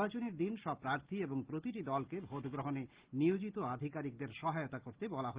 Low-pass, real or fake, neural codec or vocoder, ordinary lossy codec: 3.6 kHz; real; none; Opus, 16 kbps